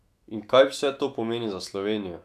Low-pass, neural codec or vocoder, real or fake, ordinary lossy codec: 14.4 kHz; autoencoder, 48 kHz, 128 numbers a frame, DAC-VAE, trained on Japanese speech; fake; none